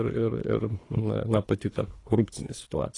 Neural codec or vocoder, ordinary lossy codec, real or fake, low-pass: codec, 24 kHz, 3 kbps, HILCodec; AAC, 32 kbps; fake; 10.8 kHz